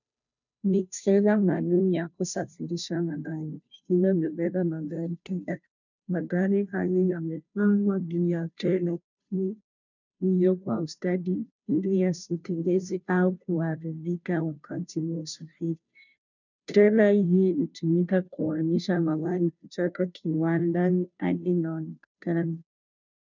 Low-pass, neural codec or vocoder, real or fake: 7.2 kHz; codec, 16 kHz, 0.5 kbps, FunCodec, trained on Chinese and English, 25 frames a second; fake